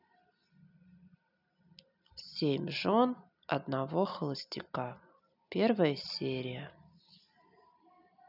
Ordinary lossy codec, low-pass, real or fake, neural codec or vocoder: none; 5.4 kHz; real; none